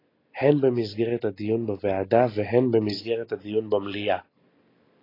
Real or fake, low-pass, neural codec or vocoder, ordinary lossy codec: real; 5.4 kHz; none; AAC, 24 kbps